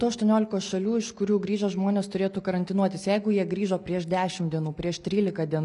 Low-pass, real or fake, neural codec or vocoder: 10.8 kHz; real; none